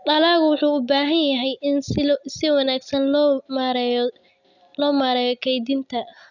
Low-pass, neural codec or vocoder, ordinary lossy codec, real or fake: 7.2 kHz; none; none; real